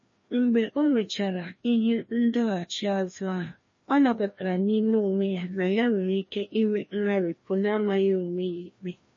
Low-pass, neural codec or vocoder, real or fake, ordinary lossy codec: 7.2 kHz; codec, 16 kHz, 1 kbps, FreqCodec, larger model; fake; MP3, 32 kbps